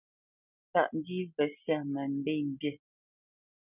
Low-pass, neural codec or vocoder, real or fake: 3.6 kHz; none; real